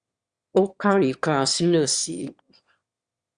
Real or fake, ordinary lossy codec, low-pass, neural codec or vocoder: fake; Opus, 64 kbps; 9.9 kHz; autoencoder, 22.05 kHz, a latent of 192 numbers a frame, VITS, trained on one speaker